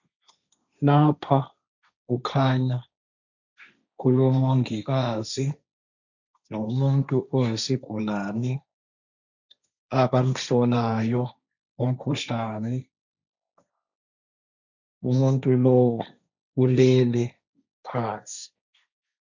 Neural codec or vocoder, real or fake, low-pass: codec, 16 kHz, 1.1 kbps, Voila-Tokenizer; fake; 7.2 kHz